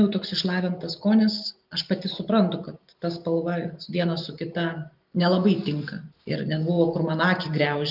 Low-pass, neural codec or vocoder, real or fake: 5.4 kHz; none; real